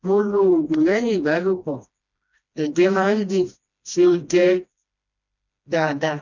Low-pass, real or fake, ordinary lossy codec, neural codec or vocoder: 7.2 kHz; fake; none; codec, 16 kHz, 1 kbps, FreqCodec, smaller model